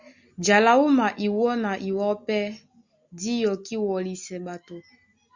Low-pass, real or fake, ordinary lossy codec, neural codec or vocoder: 7.2 kHz; real; Opus, 64 kbps; none